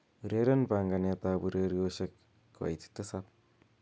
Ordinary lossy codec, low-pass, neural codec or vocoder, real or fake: none; none; none; real